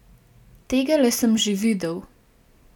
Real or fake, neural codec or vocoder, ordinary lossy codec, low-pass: real; none; none; 19.8 kHz